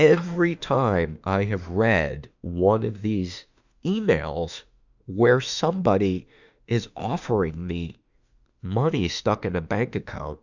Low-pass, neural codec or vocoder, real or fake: 7.2 kHz; autoencoder, 48 kHz, 32 numbers a frame, DAC-VAE, trained on Japanese speech; fake